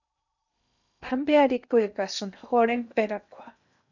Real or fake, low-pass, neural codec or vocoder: fake; 7.2 kHz; codec, 16 kHz in and 24 kHz out, 0.8 kbps, FocalCodec, streaming, 65536 codes